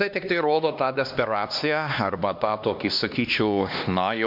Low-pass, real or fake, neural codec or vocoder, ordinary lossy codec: 5.4 kHz; fake; codec, 16 kHz, 2 kbps, X-Codec, HuBERT features, trained on LibriSpeech; MP3, 48 kbps